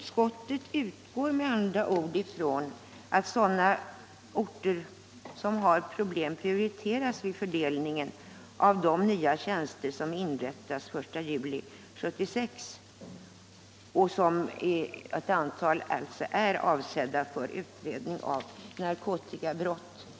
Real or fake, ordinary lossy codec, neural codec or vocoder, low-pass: real; none; none; none